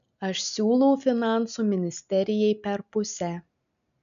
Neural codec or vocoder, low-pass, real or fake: none; 7.2 kHz; real